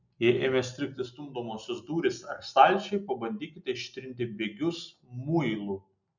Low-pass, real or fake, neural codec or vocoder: 7.2 kHz; real; none